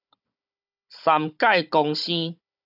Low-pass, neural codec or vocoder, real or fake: 5.4 kHz; codec, 16 kHz, 16 kbps, FunCodec, trained on Chinese and English, 50 frames a second; fake